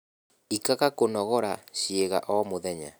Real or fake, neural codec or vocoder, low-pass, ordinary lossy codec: real; none; none; none